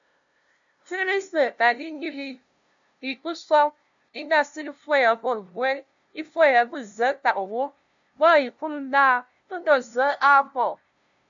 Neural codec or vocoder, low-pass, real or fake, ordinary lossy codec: codec, 16 kHz, 0.5 kbps, FunCodec, trained on LibriTTS, 25 frames a second; 7.2 kHz; fake; AAC, 64 kbps